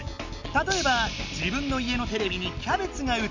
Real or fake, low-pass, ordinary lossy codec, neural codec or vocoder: real; 7.2 kHz; none; none